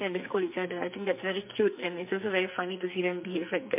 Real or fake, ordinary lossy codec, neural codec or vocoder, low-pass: fake; MP3, 32 kbps; codec, 44.1 kHz, 2.6 kbps, SNAC; 3.6 kHz